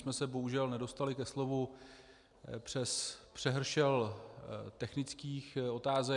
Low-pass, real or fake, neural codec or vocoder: 10.8 kHz; real; none